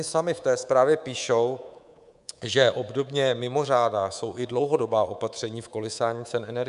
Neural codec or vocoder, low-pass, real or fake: codec, 24 kHz, 3.1 kbps, DualCodec; 10.8 kHz; fake